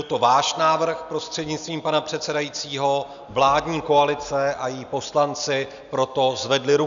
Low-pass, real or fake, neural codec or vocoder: 7.2 kHz; real; none